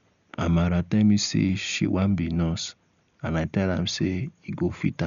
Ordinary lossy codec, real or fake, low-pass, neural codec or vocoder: none; real; 7.2 kHz; none